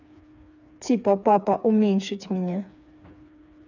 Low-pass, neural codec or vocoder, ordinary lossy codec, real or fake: 7.2 kHz; codec, 16 kHz, 4 kbps, FreqCodec, smaller model; none; fake